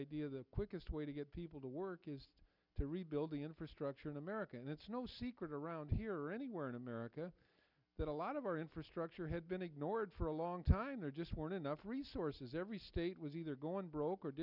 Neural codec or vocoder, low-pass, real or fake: none; 5.4 kHz; real